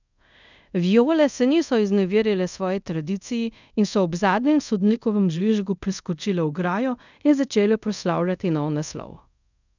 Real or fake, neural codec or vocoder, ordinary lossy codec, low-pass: fake; codec, 24 kHz, 0.5 kbps, DualCodec; none; 7.2 kHz